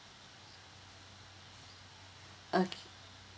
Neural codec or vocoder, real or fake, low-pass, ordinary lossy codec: none; real; none; none